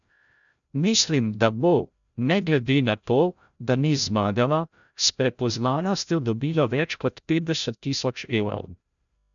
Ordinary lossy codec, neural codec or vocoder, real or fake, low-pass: none; codec, 16 kHz, 0.5 kbps, FreqCodec, larger model; fake; 7.2 kHz